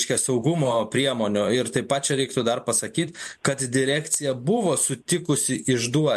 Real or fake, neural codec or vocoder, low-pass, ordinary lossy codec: fake; vocoder, 44.1 kHz, 128 mel bands every 512 samples, BigVGAN v2; 14.4 kHz; MP3, 64 kbps